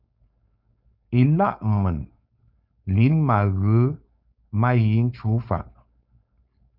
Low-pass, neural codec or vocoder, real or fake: 5.4 kHz; codec, 16 kHz, 4.8 kbps, FACodec; fake